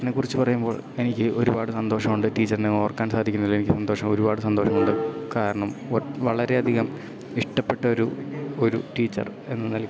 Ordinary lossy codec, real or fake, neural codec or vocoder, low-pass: none; real; none; none